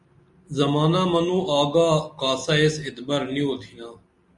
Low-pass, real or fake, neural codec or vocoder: 10.8 kHz; real; none